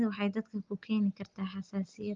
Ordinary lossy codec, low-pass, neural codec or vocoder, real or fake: Opus, 32 kbps; 7.2 kHz; none; real